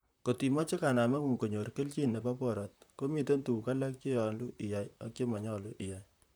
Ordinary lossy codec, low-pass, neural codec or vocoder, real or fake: none; none; vocoder, 44.1 kHz, 128 mel bands, Pupu-Vocoder; fake